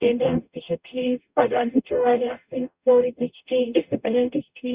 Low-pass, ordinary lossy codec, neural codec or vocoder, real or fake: 3.6 kHz; Opus, 64 kbps; codec, 44.1 kHz, 0.9 kbps, DAC; fake